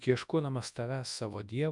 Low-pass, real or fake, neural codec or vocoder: 10.8 kHz; fake; codec, 24 kHz, 0.9 kbps, WavTokenizer, large speech release